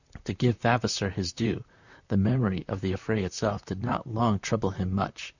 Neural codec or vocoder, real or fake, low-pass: vocoder, 44.1 kHz, 128 mel bands, Pupu-Vocoder; fake; 7.2 kHz